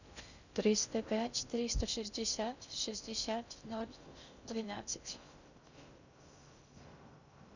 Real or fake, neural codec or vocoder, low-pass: fake; codec, 16 kHz in and 24 kHz out, 0.6 kbps, FocalCodec, streaming, 2048 codes; 7.2 kHz